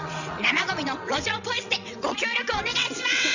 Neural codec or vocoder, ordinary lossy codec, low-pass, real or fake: vocoder, 22.05 kHz, 80 mel bands, WaveNeXt; none; 7.2 kHz; fake